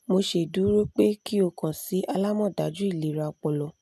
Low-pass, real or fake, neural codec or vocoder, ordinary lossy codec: 14.4 kHz; real; none; none